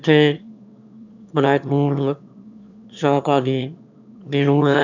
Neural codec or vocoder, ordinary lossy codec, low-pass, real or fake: autoencoder, 22.05 kHz, a latent of 192 numbers a frame, VITS, trained on one speaker; none; 7.2 kHz; fake